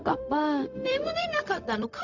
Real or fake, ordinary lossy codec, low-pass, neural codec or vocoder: fake; none; 7.2 kHz; codec, 16 kHz, 0.4 kbps, LongCat-Audio-Codec